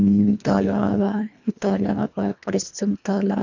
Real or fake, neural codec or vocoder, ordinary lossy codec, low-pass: fake; codec, 24 kHz, 1.5 kbps, HILCodec; none; 7.2 kHz